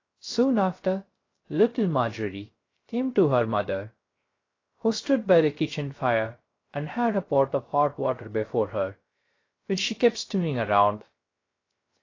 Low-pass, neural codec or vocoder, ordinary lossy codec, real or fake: 7.2 kHz; codec, 16 kHz, 0.3 kbps, FocalCodec; AAC, 32 kbps; fake